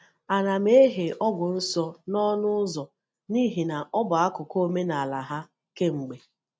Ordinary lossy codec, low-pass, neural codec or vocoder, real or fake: none; none; none; real